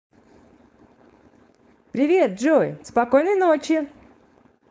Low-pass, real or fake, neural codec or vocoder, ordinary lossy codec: none; fake; codec, 16 kHz, 4.8 kbps, FACodec; none